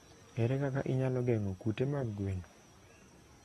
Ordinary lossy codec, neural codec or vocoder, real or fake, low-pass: AAC, 32 kbps; none; real; 19.8 kHz